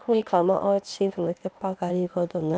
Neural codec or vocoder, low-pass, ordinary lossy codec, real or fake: codec, 16 kHz, 0.8 kbps, ZipCodec; none; none; fake